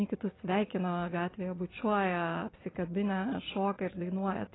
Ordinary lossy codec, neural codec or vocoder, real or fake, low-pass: AAC, 16 kbps; none; real; 7.2 kHz